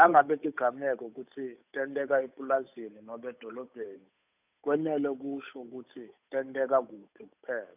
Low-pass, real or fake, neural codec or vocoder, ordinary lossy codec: 3.6 kHz; fake; codec, 16 kHz, 8 kbps, FunCodec, trained on Chinese and English, 25 frames a second; none